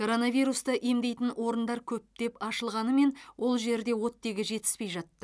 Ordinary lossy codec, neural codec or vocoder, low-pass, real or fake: none; none; none; real